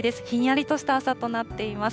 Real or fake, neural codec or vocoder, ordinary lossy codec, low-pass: real; none; none; none